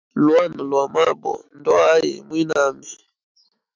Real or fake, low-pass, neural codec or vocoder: fake; 7.2 kHz; autoencoder, 48 kHz, 128 numbers a frame, DAC-VAE, trained on Japanese speech